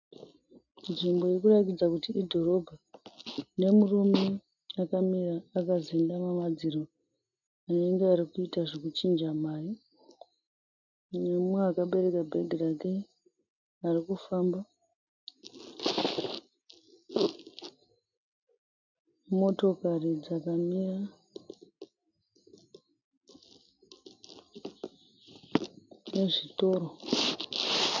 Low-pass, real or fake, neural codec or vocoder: 7.2 kHz; real; none